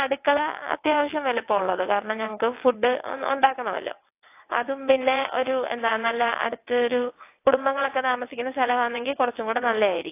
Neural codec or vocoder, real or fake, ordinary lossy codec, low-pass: vocoder, 22.05 kHz, 80 mel bands, WaveNeXt; fake; AAC, 24 kbps; 3.6 kHz